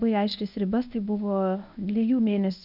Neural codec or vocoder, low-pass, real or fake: codec, 24 kHz, 0.9 kbps, WavTokenizer, medium speech release version 1; 5.4 kHz; fake